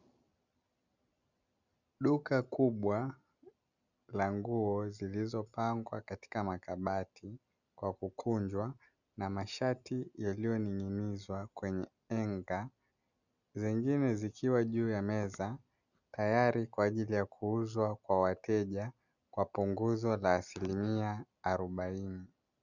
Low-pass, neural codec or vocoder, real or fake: 7.2 kHz; none; real